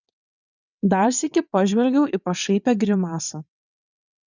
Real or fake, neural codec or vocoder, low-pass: fake; vocoder, 22.05 kHz, 80 mel bands, WaveNeXt; 7.2 kHz